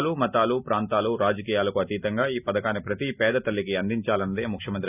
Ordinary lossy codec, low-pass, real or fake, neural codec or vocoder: none; 3.6 kHz; real; none